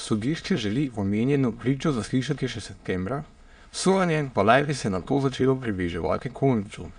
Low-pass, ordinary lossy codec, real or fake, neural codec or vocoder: 9.9 kHz; Opus, 64 kbps; fake; autoencoder, 22.05 kHz, a latent of 192 numbers a frame, VITS, trained on many speakers